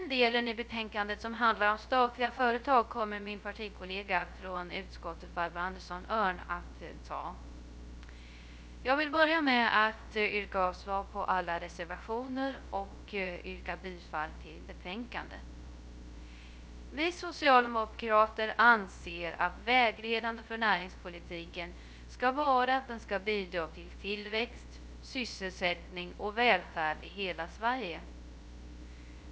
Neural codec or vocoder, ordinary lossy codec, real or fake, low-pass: codec, 16 kHz, 0.3 kbps, FocalCodec; none; fake; none